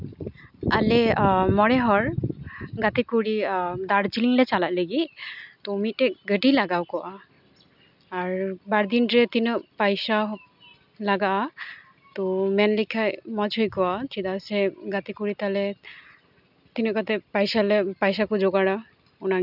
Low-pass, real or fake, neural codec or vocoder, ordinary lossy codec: 5.4 kHz; real; none; none